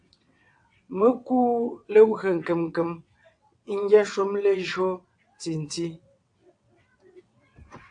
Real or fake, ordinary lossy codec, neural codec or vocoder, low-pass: fake; AAC, 64 kbps; vocoder, 22.05 kHz, 80 mel bands, WaveNeXt; 9.9 kHz